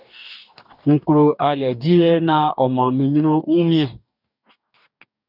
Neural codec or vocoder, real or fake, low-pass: codec, 44.1 kHz, 2.6 kbps, DAC; fake; 5.4 kHz